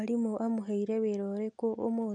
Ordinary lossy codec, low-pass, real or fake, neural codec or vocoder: none; 9.9 kHz; real; none